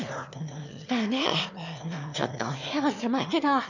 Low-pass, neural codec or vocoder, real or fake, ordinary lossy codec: 7.2 kHz; autoencoder, 22.05 kHz, a latent of 192 numbers a frame, VITS, trained on one speaker; fake; none